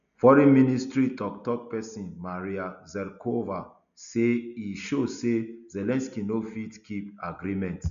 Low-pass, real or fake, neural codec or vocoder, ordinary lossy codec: 7.2 kHz; real; none; none